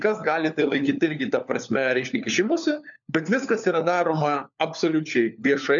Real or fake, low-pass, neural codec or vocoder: fake; 7.2 kHz; codec, 16 kHz, 4 kbps, FunCodec, trained on LibriTTS, 50 frames a second